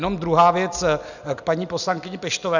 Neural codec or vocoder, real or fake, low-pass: none; real; 7.2 kHz